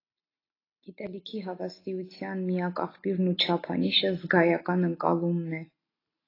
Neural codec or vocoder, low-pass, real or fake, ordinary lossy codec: none; 5.4 kHz; real; AAC, 24 kbps